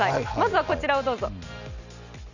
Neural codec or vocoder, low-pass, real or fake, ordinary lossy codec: none; 7.2 kHz; real; none